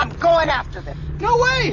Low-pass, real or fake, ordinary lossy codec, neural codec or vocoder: 7.2 kHz; real; AAC, 48 kbps; none